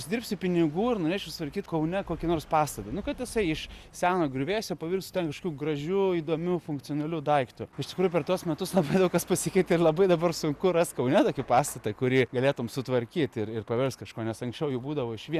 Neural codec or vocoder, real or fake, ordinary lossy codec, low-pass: none; real; Opus, 64 kbps; 14.4 kHz